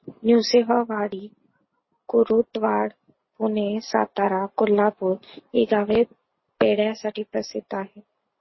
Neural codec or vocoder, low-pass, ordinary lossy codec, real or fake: none; 7.2 kHz; MP3, 24 kbps; real